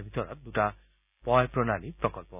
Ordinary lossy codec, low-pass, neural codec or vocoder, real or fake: none; 3.6 kHz; none; real